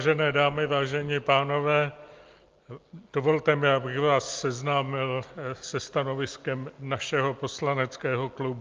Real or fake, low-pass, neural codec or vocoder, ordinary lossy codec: real; 7.2 kHz; none; Opus, 24 kbps